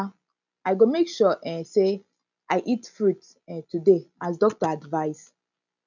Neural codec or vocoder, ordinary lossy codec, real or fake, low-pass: none; none; real; 7.2 kHz